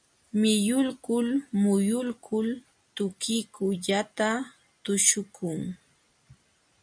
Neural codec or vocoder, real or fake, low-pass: none; real; 9.9 kHz